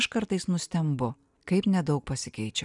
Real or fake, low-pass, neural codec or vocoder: real; 10.8 kHz; none